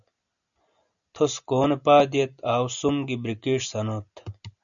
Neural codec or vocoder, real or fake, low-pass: none; real; 7.2 kHz